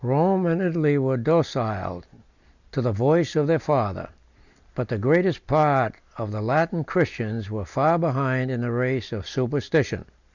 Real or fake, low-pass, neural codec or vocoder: real; 7.2 kHz; none